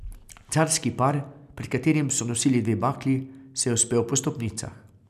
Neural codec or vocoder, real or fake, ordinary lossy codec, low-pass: none; real; none; 14.4 kHz